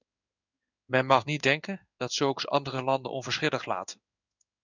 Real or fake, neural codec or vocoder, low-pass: fake; codec, 16 kHz in and 24 kHz out, 1 kbps, XY-Tokenizer; 7.2 kHz